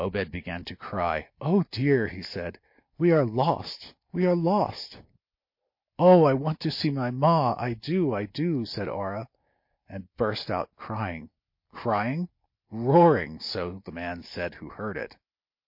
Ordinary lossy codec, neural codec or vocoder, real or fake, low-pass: MP3, 32 kbps; none; real; 5.4 kHz